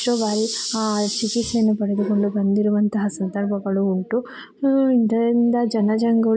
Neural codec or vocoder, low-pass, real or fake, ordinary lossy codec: none; none; real; none